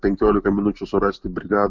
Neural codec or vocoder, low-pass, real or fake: none; 7.2 kHz; real